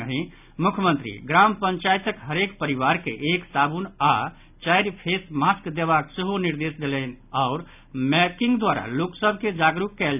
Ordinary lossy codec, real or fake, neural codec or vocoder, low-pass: none; real; none; 3.6 kHz